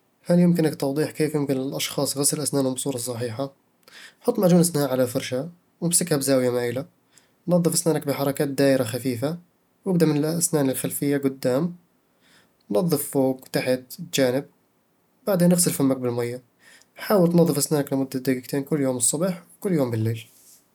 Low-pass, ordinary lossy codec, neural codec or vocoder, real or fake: 19.8 kHz; none; none; real